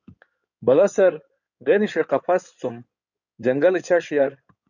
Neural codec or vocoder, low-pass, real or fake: codec, 16 kHz in and 24 kHz out, 2.2 kbps, FireRedTTS-2 codec; 7.2 kHz; fake